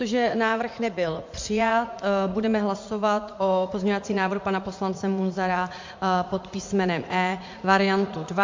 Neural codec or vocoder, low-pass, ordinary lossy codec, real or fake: vocoder, 44.1 kHz, 80 mel bands, Vocos; 7.2 kHz; MP3, 48 kbps; fake